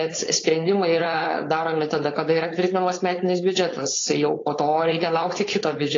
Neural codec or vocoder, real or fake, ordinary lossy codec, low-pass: codec, 16 kHz, 4.8 kbps, FACodec; fake; AAC, 32 kbps; 7.2 kHz